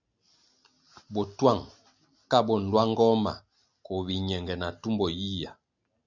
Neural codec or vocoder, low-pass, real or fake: none; 7.2 kHz; real